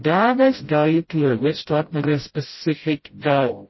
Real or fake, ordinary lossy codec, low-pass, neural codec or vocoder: fake; MP3, 24 kbps; 7.2 kHz; codec, 16 kHz, 0.5 kbps, FreqCodec, smaller model